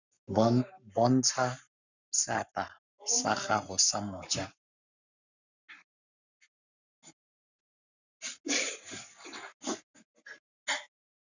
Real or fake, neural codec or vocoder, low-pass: fake; codec, 44.1 kHz, 7.8 kbps, Pupu-Codec; 7.2 kHz